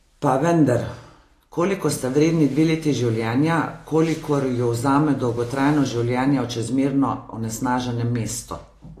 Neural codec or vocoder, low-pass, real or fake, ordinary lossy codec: none; 14.4 kHz; real; AAC, 48 kbps